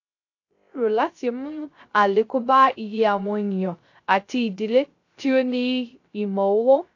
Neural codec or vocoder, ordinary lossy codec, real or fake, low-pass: codec, 16 kHz, 0.3 kbps, FocalCodec; AAC, 48 kbps; fake; 7.2 kHz